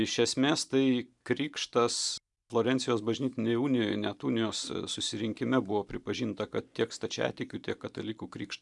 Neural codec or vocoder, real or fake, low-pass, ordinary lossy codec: vocoder, 44.1 kHz, 128 mel bands every 512 samples, BigVGAN v2; fake; 10.8 kHz; MP3, 96 kbps